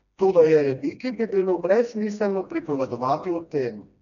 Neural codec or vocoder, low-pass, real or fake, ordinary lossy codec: codec, 16 kHz, 1 kbps, FreqCodec, smaller model; 7.2 kHz; fake; none